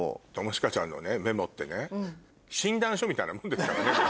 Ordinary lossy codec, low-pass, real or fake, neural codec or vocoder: none; none; real; none